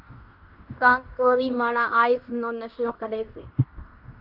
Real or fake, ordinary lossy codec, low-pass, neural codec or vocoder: fake; Opus, 24 kbps; 5.4 kHz; codec, 16 kHz in and 24 kHz out, 0.9 kbps, LongCat-Audio-Codec, fine tuned four codebook decoder